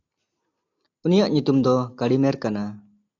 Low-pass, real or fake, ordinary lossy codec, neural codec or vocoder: 7.2 kHz; real; AAC, 48 kbps; none